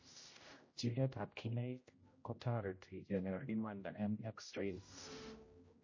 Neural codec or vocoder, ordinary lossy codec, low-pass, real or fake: codec, 16 kHz, 0.5 kbps, X-Codec, HuBERT features, trained on general audio; MP3, 32 kbps; 7.2 kHz; fake